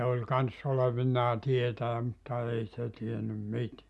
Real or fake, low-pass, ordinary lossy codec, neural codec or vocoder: real; none; none; none